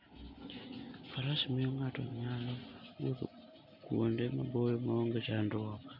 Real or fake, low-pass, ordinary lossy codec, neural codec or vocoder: real; 5.4 kHz; none; none